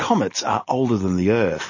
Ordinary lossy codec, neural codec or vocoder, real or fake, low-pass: MP3, 32 kbps; none; real; 7.2 kHz